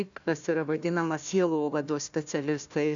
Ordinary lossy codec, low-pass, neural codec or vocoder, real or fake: MP3, 96 kbps; 7.2 kHz; codec, 16 kHz, 1 kbps, FunCodec, trained on Chinese and English, 50 frames a second; fake